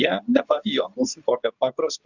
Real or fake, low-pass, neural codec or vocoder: fake; 7.2 kHz; codec, 24 kHz, 0.9 kbps, WavTokenizer, medium speech release version 2